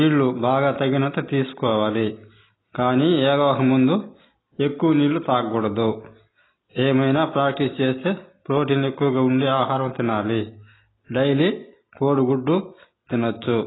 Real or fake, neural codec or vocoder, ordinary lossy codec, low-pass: real; none; AAC, 16 kbps; 7.2 kHz